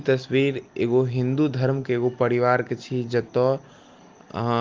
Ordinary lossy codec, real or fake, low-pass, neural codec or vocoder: Opus, 32 kbps; real; 7.2 kHz; none